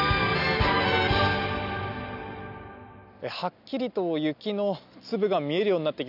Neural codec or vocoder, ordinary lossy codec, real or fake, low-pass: none; none; real; 5.4 kHz